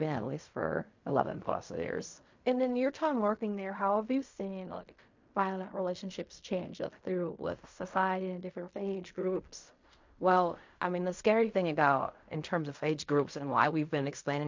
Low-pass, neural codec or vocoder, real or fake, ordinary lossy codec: 7.2 kHz; codec, 16 kHz in and 24 kHz out, 0.4 kbps, LongCat-Audio-Codec, fine tuned four codebook decoder; fake; MP3, 48 kbps